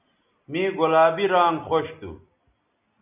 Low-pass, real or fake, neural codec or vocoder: 3.6 kHz; real; none